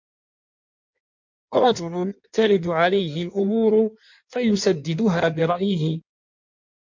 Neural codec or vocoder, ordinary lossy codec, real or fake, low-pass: codec, 16 kHz in and 24 kHz out, 1.1 kbps, FireRedTTS-2 codec; MP3, 48 kbps; fake; 7.2 kHz